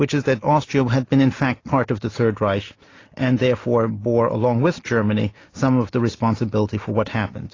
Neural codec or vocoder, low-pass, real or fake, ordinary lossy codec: none; 7.2 kHz; real; AAC, 32 kbps